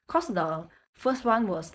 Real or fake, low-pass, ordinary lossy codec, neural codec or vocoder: fake; none; none; codec, 16 kHz, 4.8 kbps, FACodec